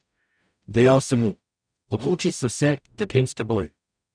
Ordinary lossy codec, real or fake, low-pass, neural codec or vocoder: none; fake; 9.9 kHz; codec, 44.1 kHz, 0.9 kbps, DAC